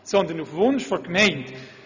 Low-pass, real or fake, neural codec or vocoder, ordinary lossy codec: 7.2 kHz; real; none; none